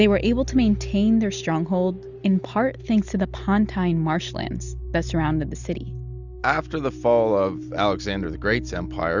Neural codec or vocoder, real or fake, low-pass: none; real; 7.2 kHz